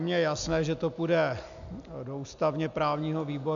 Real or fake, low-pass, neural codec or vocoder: real; 7.2 kHz; none